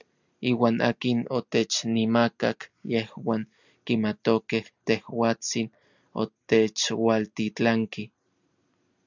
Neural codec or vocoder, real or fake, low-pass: none; real; 7.2 kHz